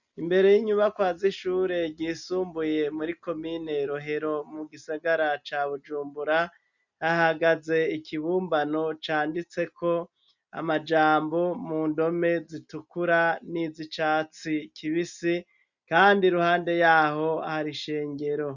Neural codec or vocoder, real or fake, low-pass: none; real; 7.2 kHz